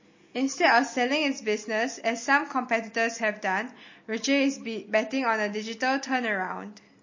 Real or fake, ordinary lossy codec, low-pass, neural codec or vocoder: real; MP3, 32 kbps; 7.2 kHz; none